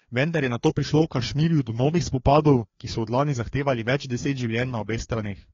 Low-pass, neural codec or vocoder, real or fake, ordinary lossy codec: 7.2 kHz; codec, 16 kHz, 2 kbps, FreqCodec, larger model; fake; AAC, 32 kbps